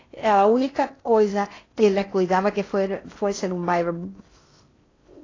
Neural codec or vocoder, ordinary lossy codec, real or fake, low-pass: codec, 16 kHz in and 24 kHz out, 0.6 kbps, FocalCodec, streaming, 4096 codes; AAC, 32 kbps; fake; 7.2 kHz